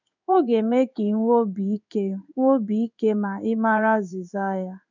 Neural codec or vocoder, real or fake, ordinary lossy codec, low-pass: codec, 16 kHz in and 24 kHz out, 1 kbps, XY-Tokenizer; fake; none; 7.2 kHz